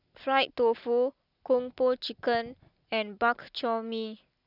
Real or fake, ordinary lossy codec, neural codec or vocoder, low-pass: real; none; none; 5.4 kHz